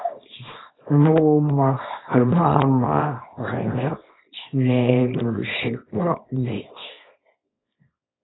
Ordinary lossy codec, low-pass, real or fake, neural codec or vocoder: AAC, 16 kbps; 7.2 kHz; fake; codec, 24 kHz, 0.9 kbps, WavTokenizer, small release